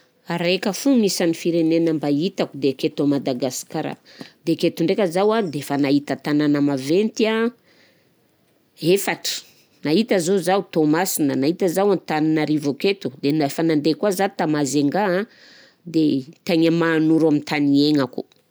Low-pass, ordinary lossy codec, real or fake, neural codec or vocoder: none; none; real; none